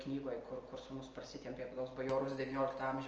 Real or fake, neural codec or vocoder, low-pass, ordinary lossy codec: real; none; 7.2 kHz; Opus, 24 kbps